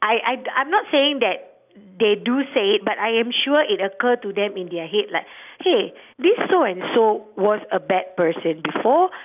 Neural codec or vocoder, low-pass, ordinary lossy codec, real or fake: none; 3.6 kHz; none; real